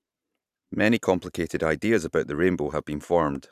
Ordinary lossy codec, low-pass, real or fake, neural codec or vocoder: none; 14.4 kHz; fake; vocoder, 44.1 kHz, 128 mel bands every 512 samples, BigVGAN v2